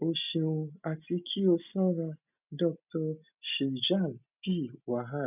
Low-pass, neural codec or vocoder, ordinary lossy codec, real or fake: 3.6 kHz; none; none; real